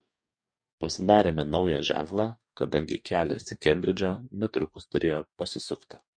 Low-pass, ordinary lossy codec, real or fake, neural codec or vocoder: 9.9 kHz; MP3, 48 kbps; fake; codec, 44.1 kHz, 2.6 kbps, DAC